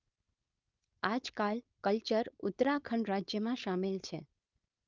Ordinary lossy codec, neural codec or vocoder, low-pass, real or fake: Opus, 32 kbps; codec, 16 kHz, 4.8 kbps, FACodec; 7.2 kHz; fake